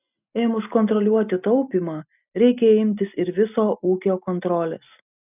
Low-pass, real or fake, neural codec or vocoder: 3.6 kHz; real; none